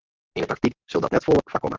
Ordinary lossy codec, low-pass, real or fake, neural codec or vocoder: Opus, 16 kbps; 7.2 kHz; real; none